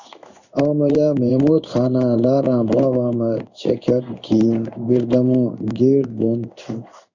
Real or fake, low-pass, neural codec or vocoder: fake; 7.2 kHz; codec, 16 kHz in and 24 kHz out, 1 kbps, XY-Tokenizer